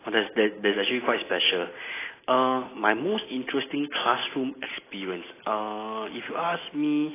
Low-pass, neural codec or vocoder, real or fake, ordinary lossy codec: 3.6 kHz; none; real; AAC, 16 kbps